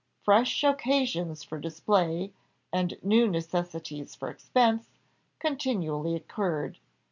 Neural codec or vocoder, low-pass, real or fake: none; 7.2 kHz; real